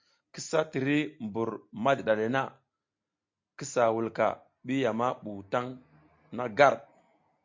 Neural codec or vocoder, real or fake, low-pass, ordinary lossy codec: none; real; 7.2 kHz; MP3, 48 kbps